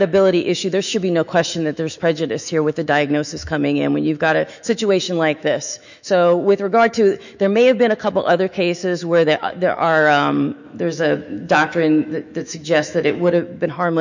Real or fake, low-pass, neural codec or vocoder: fake; 7.2 kHz; autoencoder, 48 kHz, 128 numbers a frame, DAC-VAE, trained on Japanese speech